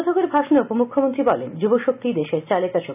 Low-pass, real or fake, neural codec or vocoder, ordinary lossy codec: 3.6 kHz; real; none; none